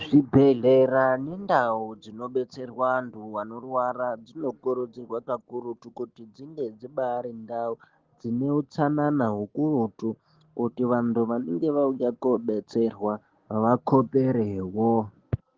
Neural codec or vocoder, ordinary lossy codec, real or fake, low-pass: none; Opus, 16 kbps; real; 7.2 kHz